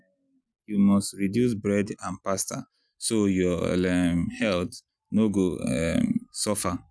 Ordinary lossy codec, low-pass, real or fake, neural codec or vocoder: none; none; real; none